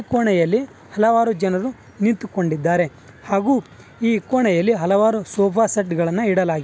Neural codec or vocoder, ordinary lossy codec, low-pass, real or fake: none; none; none; real